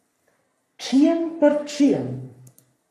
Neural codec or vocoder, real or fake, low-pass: codec, 44.1 kHz, 3.4 kbps, Pupu-Codec; fake; 14.4 kHz